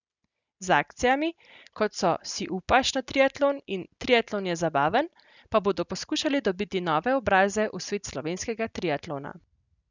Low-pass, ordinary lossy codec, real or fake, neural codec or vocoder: 7.2 kHz; none; real; none